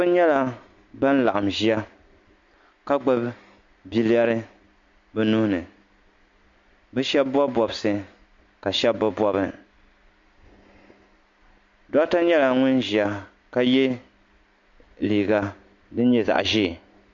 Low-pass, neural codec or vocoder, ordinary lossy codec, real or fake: 7.2 kHz; none; MP3, 48 kbps; real